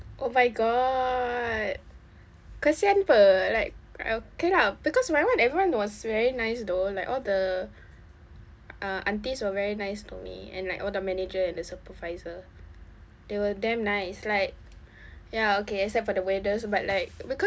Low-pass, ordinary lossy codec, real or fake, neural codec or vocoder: none; none; real; none